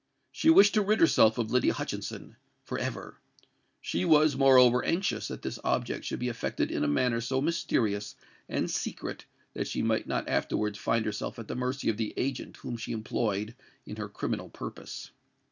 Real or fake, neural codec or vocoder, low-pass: real; none; 7.2 kHz